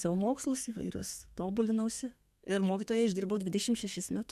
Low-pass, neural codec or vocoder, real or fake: 14.4 kHz; codec, 32 kHz, 1.9 kbps, SNAC; fake